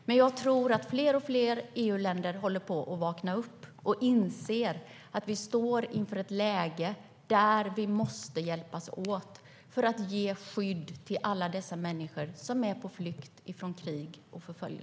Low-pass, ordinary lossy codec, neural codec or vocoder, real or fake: none; none; none; real